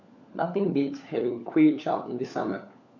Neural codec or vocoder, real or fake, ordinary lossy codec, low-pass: codec, 16 kHz, 4 kbps, FunCodec, trained on LibriTTS, 50 frames a second; fake; none; 7.2 kHz